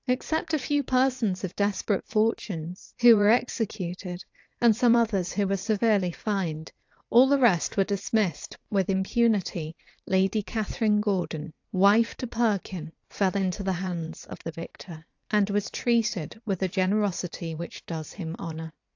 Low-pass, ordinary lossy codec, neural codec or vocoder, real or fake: 7.2 kHz; AAC, 48 kbps; vocoder, 44.1 kHz, 80 mel bands, Vocos; fake